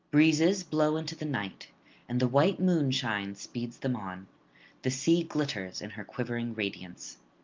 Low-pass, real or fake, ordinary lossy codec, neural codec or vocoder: 7.2 kHz; real; Opus, 24 kbps; none